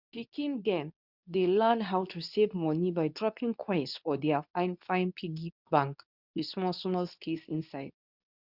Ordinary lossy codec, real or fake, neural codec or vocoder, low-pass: none; fake; codec, 24 kHz, 0.9 kbps, WavTokenizer, medium speech release version 2; 5.4 kHz